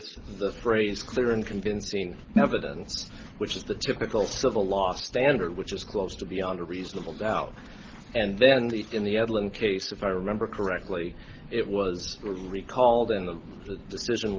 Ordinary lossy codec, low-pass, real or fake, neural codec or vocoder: Opus, 16 kbps; 7.2 kHz; real; none